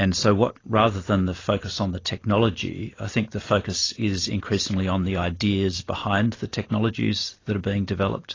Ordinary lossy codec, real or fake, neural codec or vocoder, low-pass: AAC, 32 kbps; real; none; 7.2 kHz